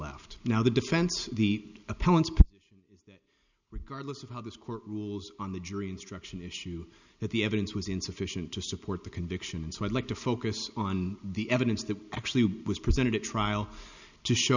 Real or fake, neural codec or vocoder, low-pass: real; none; 7.2 kHz